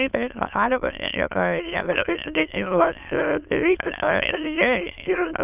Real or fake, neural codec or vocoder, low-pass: fake; autoencoder, 22.05 kHz, a latent of 192 numbers a frame, VITS, trained on many speakers; 3.6 kHz